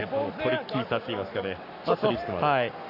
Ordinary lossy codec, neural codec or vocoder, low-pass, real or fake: none; codec, 44.1 kHz, 7.8 kbps, Pupu-Codec; 5.4 kHz; fake